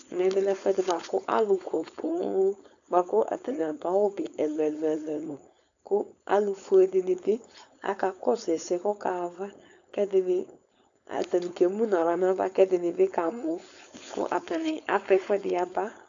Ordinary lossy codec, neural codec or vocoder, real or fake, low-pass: AAC, 64 kbps; codec, 16 kHz, 4.8 kbps, FACodec; fake; 7.2 kHz